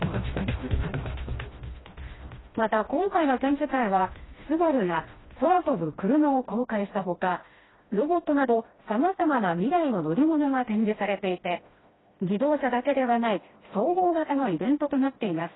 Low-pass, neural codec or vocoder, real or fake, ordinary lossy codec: 7.2 kHz; codec, 16 kHz, 1 kbps, FreqCodec, smaller model; fake; AAC, 16 kbps